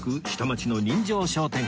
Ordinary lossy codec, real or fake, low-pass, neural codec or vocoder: none; real; none; none